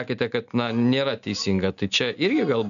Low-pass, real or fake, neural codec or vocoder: 7.2 kHz; real; none